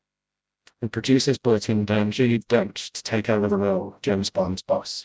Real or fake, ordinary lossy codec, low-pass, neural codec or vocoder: fake; none; none; codec, 16 kHz, 0.5 kbps, FreqCodec, smaller model